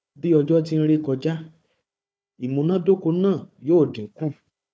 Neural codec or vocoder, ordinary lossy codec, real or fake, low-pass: codec, 16 kHz, 4 kbps, FunCodec, trained on Chinese and English, 50 frames a second; none; fake; none